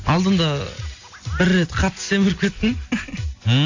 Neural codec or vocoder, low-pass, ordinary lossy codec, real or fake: none; 7.2 kHz; AAC, 48 kbps; real